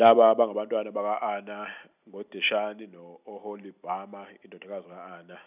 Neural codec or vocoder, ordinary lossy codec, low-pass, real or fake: none; none; 3.6 kHz; real